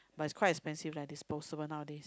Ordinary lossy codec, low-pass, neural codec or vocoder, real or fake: none; none; none; real